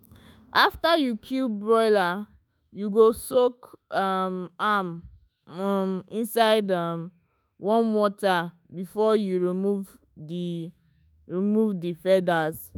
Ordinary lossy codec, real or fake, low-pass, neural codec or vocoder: none; fake; none; autoencoder, 48 kHz, 32 numbers a frame, DAC-VAE, trained on Japanese speech